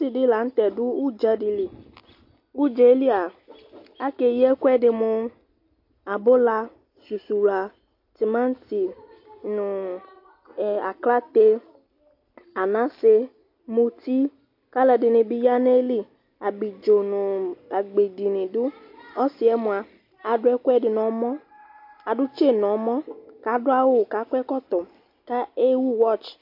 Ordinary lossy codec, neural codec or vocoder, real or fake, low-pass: MP3, 32 kbps; none; real; 5.4 kHz